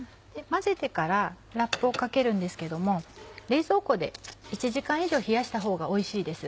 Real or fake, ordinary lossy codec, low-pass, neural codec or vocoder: real; none; none; none